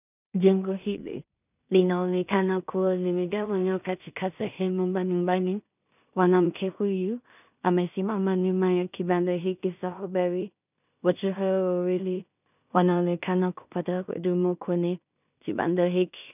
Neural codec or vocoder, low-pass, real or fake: codec, 16 kHz in and 24 kHz out, 0.4 kbps, LongCat-Audio-Codec, two codebook decoder; 3.6 kHz; fake